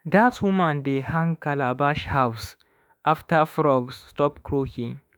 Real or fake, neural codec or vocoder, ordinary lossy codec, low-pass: fake; autoencoder, 48 kHz, 32 numbers a frame, DAC-VAE, trained on Japanese speech; none; none